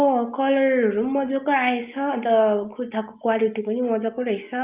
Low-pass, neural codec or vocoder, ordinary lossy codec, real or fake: 3.6 kHz; none; Opus, 32 kbps; real